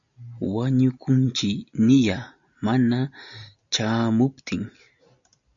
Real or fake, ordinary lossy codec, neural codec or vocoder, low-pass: real; MP3, 96 kbps; none; 7.2 kHz